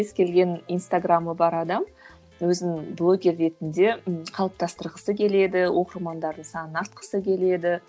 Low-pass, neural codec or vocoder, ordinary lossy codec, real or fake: none; none; none; real